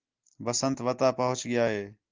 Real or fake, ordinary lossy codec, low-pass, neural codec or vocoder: real; Opus, 32 kbps; 7.2 kHz; none